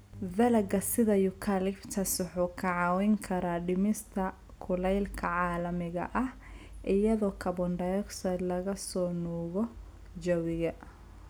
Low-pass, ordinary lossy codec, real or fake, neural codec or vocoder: none; none; real; none